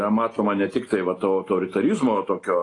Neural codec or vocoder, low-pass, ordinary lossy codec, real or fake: none; 10.8 kHz; AAC, 32 kbps; real